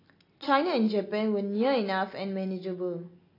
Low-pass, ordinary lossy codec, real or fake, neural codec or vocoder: 5.4 kHz; AAC, 24 kbps; real; none